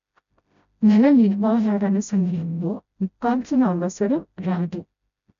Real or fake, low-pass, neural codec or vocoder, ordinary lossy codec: fake; 7.2 kHz; codec, 16 kHz, 0.5 kbps, FreqCodec, smaller model; none